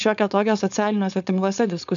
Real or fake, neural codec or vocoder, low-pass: fake; codec, 16 kHz, 4.8 kbps, FACodec; 7.2 kHz